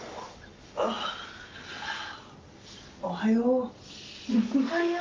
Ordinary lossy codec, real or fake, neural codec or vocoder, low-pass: Opus, 24 kbps; real; none; 7.2 kHz